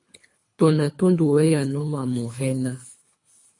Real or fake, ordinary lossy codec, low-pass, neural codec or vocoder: fake; MP3, 48 kbps; 10.8 kHz; codec, 24 kHz, 3 kbps, HILCodec